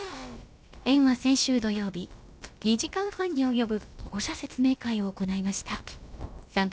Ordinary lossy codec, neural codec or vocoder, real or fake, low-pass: none; codec, 16 kHz, about 1 kbps, DyCAST, with the encoder's durations; fake; none